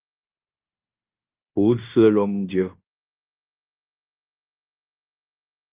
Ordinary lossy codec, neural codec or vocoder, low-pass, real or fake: Opus, 32 kbps; codec, 16 kHz in and 24 kHz out, 0.9 kbps, LongCat-Audio-Codec, fine tuned four codebook decoder; 3.6 kHz; fake